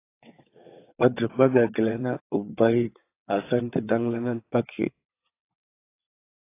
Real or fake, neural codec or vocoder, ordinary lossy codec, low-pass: fake; vocoder, 22.05 kHz, 80 mel bands, WaveNeXt; AAC, 24 kbps; 3.6 kHz